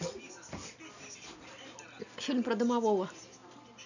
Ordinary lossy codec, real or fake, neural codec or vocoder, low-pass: none; real; none; 7.2 kHz